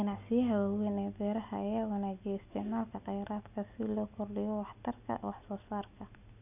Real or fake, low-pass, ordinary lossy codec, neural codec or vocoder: real; 3.6 kHz; none; none